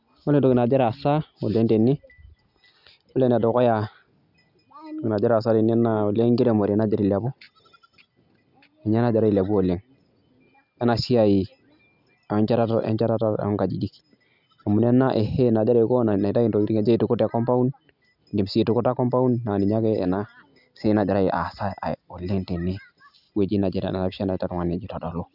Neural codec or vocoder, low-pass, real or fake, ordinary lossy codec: none; 5.4 kHz; real; none